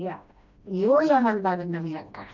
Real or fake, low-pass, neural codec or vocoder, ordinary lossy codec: fake; 7.2 kHz; codec, 16 kHz, 1 kbps, FreqCodec, smaller model; none